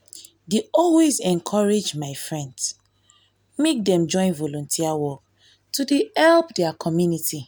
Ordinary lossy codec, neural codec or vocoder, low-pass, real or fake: none; none; none; real